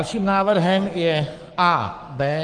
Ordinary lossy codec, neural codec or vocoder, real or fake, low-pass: Opus, 24 kbps; codec, 44.1 kHz, 7.8 kbps, Pupu-Codec; fake; 9.9 kHz